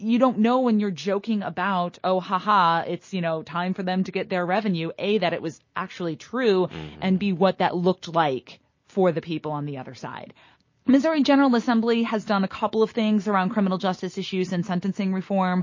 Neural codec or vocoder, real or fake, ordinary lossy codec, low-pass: none; real; MP3, 32 kbps; 7.2 kHz